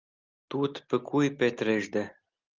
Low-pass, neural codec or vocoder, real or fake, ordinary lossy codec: 7.2 kHz; none; real; Opus, 32 kbps